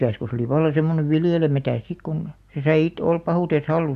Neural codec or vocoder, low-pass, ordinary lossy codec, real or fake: none; 14.4 kHz; AAC, 96 kbps; real